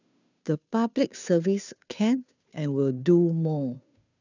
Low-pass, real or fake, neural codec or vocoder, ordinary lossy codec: 7.2 kHz; fake; codec, 16 kHz, 2 kbps, FunCodec, trained on Chinese and English, 25 frames a second; none